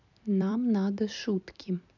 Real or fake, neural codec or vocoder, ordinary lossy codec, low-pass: fake; vocoder, 44.1 kHz, 128 mel bands every 256 samples, BigVGAN v2; none; 7.2 kHz